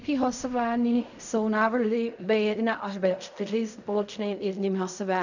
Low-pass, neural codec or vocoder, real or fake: 7.2 kHz; codec, 16 kHz in and 24 kHz out, 0.4 kbps, LongCat-Audio-Codec, fine tuned four codebook decoder; fake